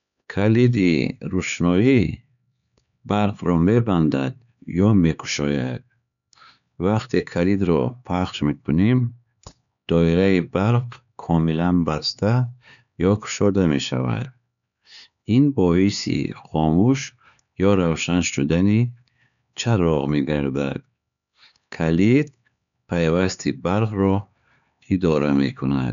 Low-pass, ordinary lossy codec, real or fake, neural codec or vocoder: 7.2 kHz; none; fake; codec, 16 kHz, 4 kbps, X-Codec, HuBERT features, trained on LibriSpeech